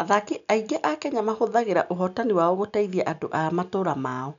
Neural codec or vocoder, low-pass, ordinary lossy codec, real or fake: none; 7.2 kHz; none; real